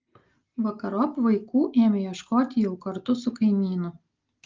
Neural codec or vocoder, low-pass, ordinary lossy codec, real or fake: none; 7.2 kHz; Opus, 32 kbps; real